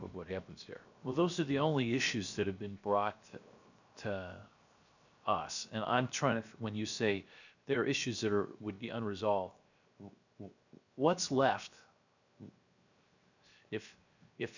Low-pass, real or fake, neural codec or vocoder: 7.2 kHz; fake; codec, 16 kHz, 0.7 kbps, FocalCodec